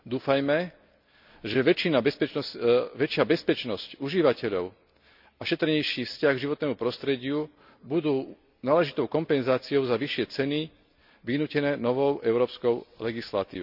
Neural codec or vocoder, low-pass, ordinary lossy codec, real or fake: none; 5.4 kHz; none; real